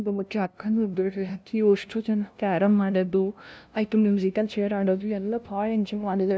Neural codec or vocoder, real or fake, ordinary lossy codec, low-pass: codec, 16 kHz, 0.5 kbps, FunCodec, trained on LibriTTS, 25 frames a second; fake; none; none